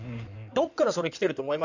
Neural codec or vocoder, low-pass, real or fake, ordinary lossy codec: codec, 16 kHz in and 24 kHz out, 2.2 kbps, FireRedTTS-2 codec; 7.2 kHz; fake; none